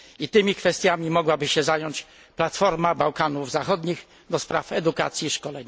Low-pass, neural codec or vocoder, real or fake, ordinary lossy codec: none; none; real; none